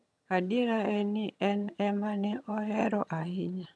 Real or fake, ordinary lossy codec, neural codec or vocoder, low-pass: fake; none; vocoder, 22.05 kHz, 80 mel bands, HiFi-GAN; none